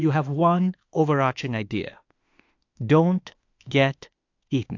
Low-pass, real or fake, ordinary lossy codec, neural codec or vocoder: 7.2 kHz; fake; AAC, 48 kbps; autoencoder, 48 kHz, 32 numbers a frame, DAC-VAE, trained on Japanese speech